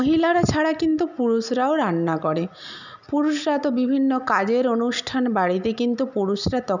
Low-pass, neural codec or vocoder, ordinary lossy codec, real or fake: 7.2 kHz; none; none; real